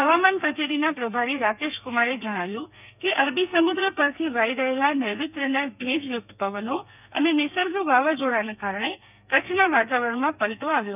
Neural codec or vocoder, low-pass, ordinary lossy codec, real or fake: codec, 32 kHz, 1.9 kbps, SNAC; 3.6 kHz; none; fake